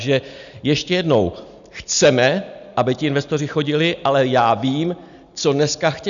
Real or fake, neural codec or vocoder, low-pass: real; none; 7.2 kHz